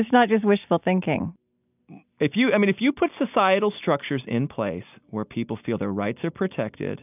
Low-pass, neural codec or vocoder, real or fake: 3.6 kHz; none; real